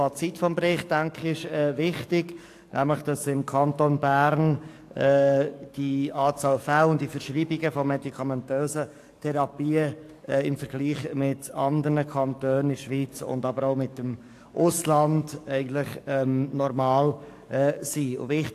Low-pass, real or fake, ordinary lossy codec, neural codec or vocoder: 14.4 kHz; fake; AAC, 64 kbps; codec, 44.1 kHz, 7.8 kbps, DAC